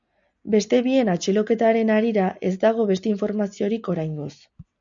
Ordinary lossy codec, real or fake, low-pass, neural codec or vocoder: MP3, 64 kbps; real; 7.2 kHz; none